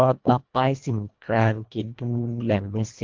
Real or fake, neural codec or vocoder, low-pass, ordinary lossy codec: fake; codec, 24 kHz, 1.5 kbps, HILCodec; 7.2 kHz; Opus, 32 kbps